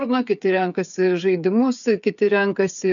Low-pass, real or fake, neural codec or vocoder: 7.2 kHz; fake; codec, 16 kHz, 8 kbps, FreqCodec, smaller model